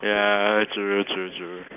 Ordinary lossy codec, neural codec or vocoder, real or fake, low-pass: none; none; real; 3.6 kHz